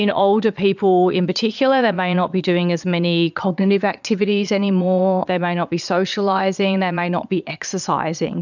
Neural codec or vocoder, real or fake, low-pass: vocoder, 22.05 kHz, 80 mel bands, Vocos; fake; 7.2 kHz